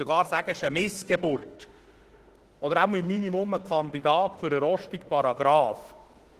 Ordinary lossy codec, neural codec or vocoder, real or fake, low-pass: Opus, 16 kbps; autoencoder, 48 kHz, 32 numbers a frame, DAC-VAE, trained on Japanese speech; fake; 14.4 kHz